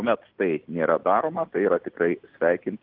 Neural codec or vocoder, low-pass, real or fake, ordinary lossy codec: none; 5.4 kHz; real; Opus, 32 kbps